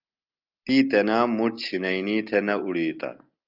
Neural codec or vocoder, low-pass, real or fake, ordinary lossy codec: none; 5.4 kHz; real; Opus, 24 kbps